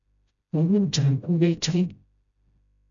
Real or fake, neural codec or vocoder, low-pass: fake; codec, 16 kHz, 0.5 kbps, FreqCodec, smaller model; 7.2 kHz